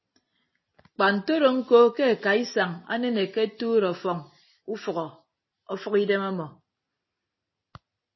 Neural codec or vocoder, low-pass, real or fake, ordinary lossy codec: none; 7.2 kHz; real; MP3, 24 kbps